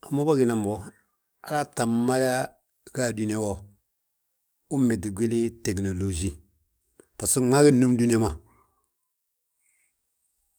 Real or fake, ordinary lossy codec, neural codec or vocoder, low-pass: fake; none; codec, 44.1 kHz, 7.8 kbps, DAC; none